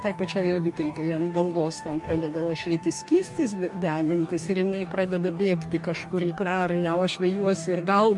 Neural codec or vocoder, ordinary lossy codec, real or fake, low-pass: codec, 44.1 kHz, 2.6 kbps, DAC; MP3, 64 kbps; fake; 10.8 kHz